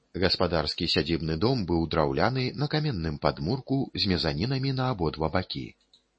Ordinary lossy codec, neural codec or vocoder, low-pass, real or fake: MP3, 32 kbps; none; 9.9 kHz; real